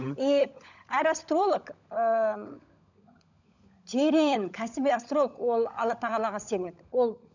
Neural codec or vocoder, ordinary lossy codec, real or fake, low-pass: codec, 16 kHz, 16 kbps, FunCodec, trained on LibriTTS, 50 frames a second; none; fake; 7.2 kHz